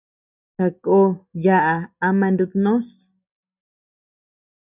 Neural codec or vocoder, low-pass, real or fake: none; 3.6 kHz; real